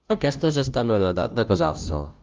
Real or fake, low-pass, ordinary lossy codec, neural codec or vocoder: fake; 7.2 kHz; Opus, 32 kbps; codec, 16 kHz, 0.5 kbps, FunCodec, trained on Chinese and English, 25 frames a second